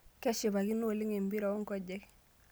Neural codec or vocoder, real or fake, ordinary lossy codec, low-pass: none; real; none; none